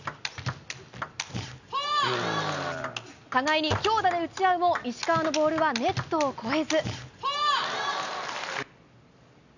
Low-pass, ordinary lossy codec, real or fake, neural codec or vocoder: 7.2 kHz; none; real; none